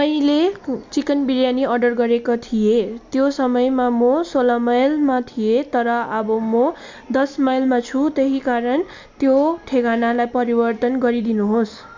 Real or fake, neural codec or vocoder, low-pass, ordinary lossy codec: real; none; 7.2 kHz; MP3, 64 kbps